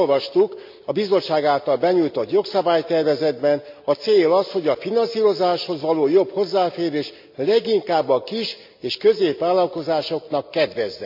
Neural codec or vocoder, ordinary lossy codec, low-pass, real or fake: none; MP3, 48 kbps; 5.4 kHz; real